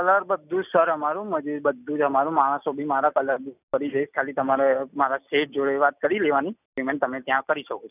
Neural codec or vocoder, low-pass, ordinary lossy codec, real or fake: none; 3.6 kHz; none; real